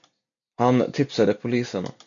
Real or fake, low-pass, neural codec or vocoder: real; 7.2 kHz; none